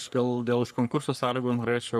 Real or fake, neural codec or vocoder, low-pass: fake; codec, 44.1 kHz, 3.4 kbps, Pupu-Codec; 14.4 kHz